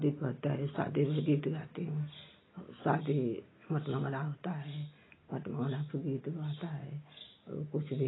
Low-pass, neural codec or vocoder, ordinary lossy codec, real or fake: 7.2 kHz; none; AAC, 16 kbps; real